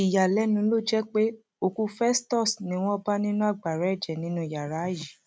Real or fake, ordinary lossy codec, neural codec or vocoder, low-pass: real; none; none; none